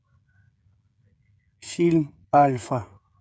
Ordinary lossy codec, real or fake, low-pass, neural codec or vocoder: none; fake; none; codec, 16 kHz, 16 kbps, FreqCodec, smaller model